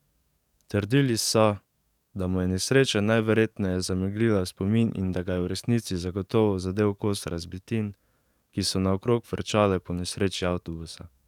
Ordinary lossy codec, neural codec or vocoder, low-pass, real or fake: none; codec, 44.1 kHz, 7.8 kbps, DAC; 19.8 kHz; fake